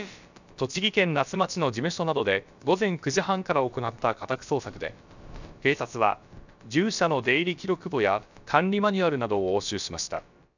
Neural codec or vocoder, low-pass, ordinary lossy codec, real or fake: codec, 16 kHz, about 1 kbps, DyCAST, with the encoder's durations; 7.2 kHz; none; fake